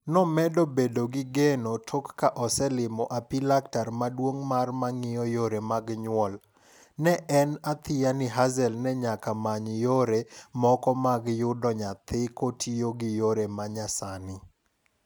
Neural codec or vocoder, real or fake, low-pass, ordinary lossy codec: none; real; none; none